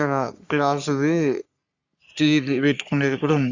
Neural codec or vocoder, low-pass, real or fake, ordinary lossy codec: codec, 44.1 kHz, 3.4 kbps, Pupu-Codec; 7.2 kHz; fake; Opus, 64 kbps